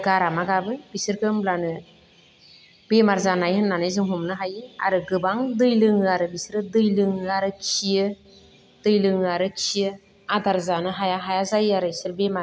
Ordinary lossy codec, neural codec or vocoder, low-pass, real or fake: none; none; none; real